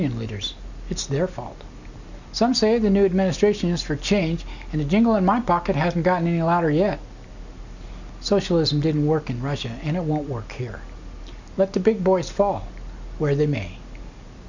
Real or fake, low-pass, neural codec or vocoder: real; 7.2 kHz; none